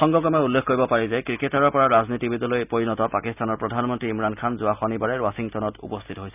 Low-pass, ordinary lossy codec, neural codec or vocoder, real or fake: 3.6 kHz; none; none; real